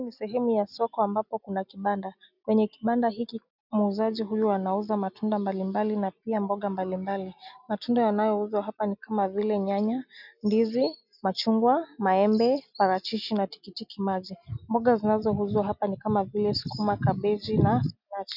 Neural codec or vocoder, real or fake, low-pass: none; real; 5.4 kHz